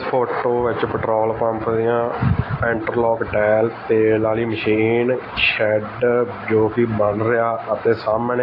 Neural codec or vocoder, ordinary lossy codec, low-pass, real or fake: none; AAC, 24 kbps; 5.4 kHz; real